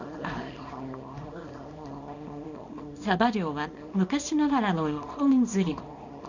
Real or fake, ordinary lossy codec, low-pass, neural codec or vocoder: fake; none; 7.2 kHz; codec, 24 kHz, 0.9 kbps, WavTokenizer, small release